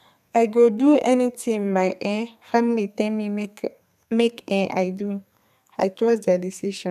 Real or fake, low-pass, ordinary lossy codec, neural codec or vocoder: fake; 14.4 kHz; none; codec, 32 kHz, 1.9 kbps, SNAC